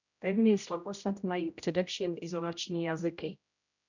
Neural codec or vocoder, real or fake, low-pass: codec, 16 kHz, 0.5 kbps, X-Codec, HuBERT features, trained on general audio; fake; 7.2 kHz